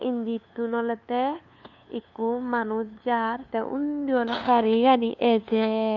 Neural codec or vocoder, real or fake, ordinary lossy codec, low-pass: codec, 16 kHz, 2 kbps, FunCodec, trained on LibriTTS, 25 frames a second; fake; none; 7.2 kHz